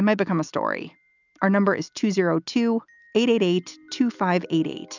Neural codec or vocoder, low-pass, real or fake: none; 7.2 kHz; real